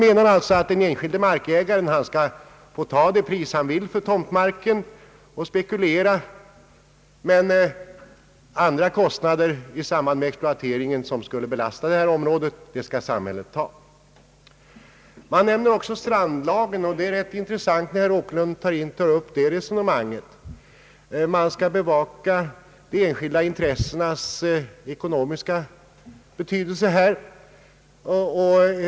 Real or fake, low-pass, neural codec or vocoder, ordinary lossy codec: real; none; none; none